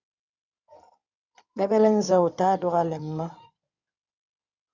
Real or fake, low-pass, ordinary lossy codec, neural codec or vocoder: fake; 7.2 kHz; Opus, 64 kbps; codec, 16 kHz in and 24 kHz out, 2.2 kbps, FireRedTTS-2 codec